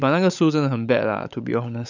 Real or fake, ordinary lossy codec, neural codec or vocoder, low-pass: fake; none; codec, 16 kHz, 16 kbps, FunCodec, trained on LibriTTS, 50 frames a second; 7.2 kHz